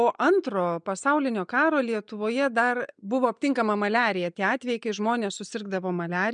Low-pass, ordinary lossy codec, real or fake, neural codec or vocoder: 9.9 kHz; MP3, 96 kbps; real; none